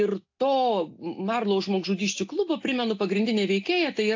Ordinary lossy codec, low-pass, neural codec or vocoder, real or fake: AAC, 48 kbps; 7.2 kHz; none; real